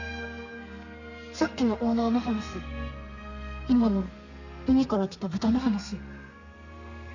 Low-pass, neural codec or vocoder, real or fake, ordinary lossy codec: 7.2 kHz; codec, 32 kHz, 1.9 kbps, SNAC; fake; none